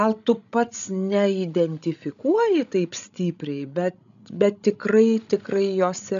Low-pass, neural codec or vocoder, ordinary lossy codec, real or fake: 7.2 kHz; codec, 16 kHz, 16 kbps, FreqCodec, larger model; MP3, 96 kbps; fake